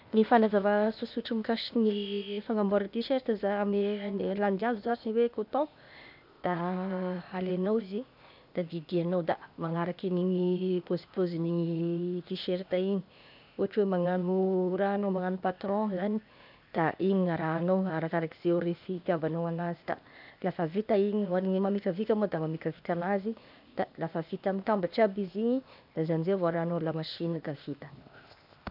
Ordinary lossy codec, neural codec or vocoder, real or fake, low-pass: none; codec, 16 kHz, 0.8 kbps, ZipCodec; fake; 5.4 kHz